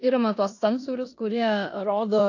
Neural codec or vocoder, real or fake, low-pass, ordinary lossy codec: codec, 16 kHz in and 24 kHz out, 0.9 kbps, LongCat-Audio-Codec, four codebook decoder; fake; 7.2 kHz; AAC, 48 kbps